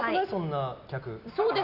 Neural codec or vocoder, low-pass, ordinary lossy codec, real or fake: none; 5.4 kHz; none; real